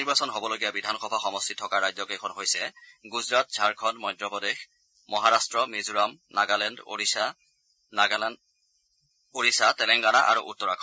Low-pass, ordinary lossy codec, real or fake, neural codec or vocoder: none; none; real; none